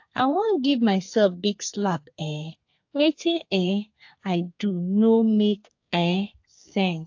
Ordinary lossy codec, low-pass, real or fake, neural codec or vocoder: AAC, 48 kbps; 7.2 kHz; fake; codec, 32 kHz, 1.9 kbps, SNAC